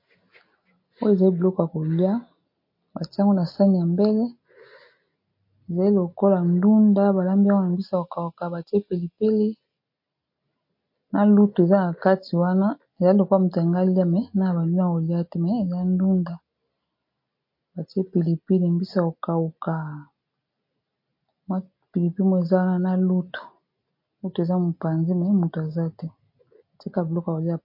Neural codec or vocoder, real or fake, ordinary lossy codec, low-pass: none; real; MP3, 32 kbps; 5.4 kHz